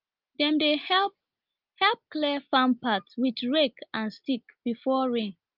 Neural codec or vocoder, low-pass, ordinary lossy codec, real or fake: none; 5.4 kHz; Opus, 32 kbps; real